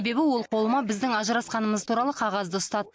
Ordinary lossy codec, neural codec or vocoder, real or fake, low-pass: none; none; real; none